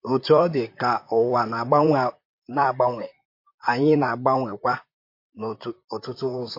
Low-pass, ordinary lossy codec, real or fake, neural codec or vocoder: 5.4 kHz; MP3, 32 kbps; fake; vocoder, 44.1 kHz, 128 mel bands, Pupu-Vocoder